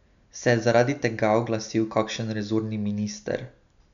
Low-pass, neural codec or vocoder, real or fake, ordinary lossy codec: 7.2 kHz; none; real; none